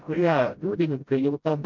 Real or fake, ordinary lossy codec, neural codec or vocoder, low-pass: fake; MP3, 64 kbps; codec, 16 kHz, 0.5 kbps, FreqCodec, smaller model; 7.2 kHz